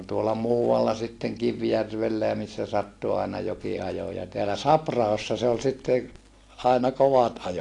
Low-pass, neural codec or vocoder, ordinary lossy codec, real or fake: 10.8 kHz; none; AAC, 48 kbps; real